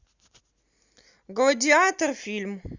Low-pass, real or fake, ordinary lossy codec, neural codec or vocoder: 7.2 kHz; real; Opus, 64 kbps; none